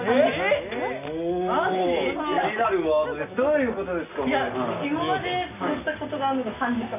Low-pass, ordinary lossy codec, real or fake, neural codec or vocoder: 3.6 kHz; none; real; none